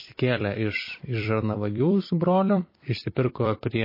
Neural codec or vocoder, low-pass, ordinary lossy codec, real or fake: vocoder, 44.1 kHz, 128 mel bands every 256 samples, BigVGAN v2; 5.4 kHz; MP3, 24 kbps; fake